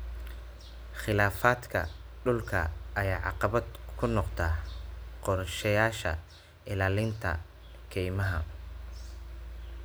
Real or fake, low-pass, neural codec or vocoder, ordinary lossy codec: real; none; none; none